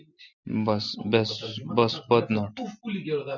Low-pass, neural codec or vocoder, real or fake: 7.2 kHz; none; real